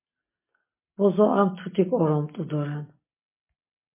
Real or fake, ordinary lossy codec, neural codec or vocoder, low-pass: real; MP3, 24 kbps; none; 3.6 kHz